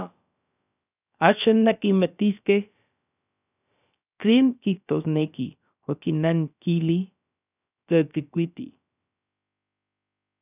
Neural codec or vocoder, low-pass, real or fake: codec, 16 kHz, about 1 kbps, DyCAST, with the encoder's durations; 3.6 kHz; fake